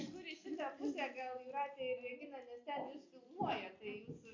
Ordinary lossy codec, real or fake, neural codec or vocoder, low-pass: MP3, 64 kbps; real; none; 7.2 kHz